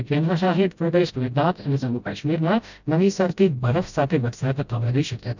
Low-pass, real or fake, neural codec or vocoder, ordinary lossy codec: 7.2 kHz; fake; codec, 16 kHz, 0.5 kbps, FreqCodec, smaller model; none